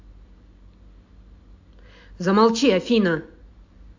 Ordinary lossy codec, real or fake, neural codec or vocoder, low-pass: AAC, 48 kbps; real; none; 7.2 kHz